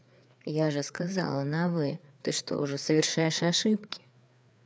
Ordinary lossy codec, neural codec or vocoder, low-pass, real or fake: none; codec, 16 kHz, 4 kbps, FreqCodec, larger model; none; fake